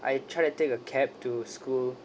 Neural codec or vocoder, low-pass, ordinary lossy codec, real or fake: none; none; none; real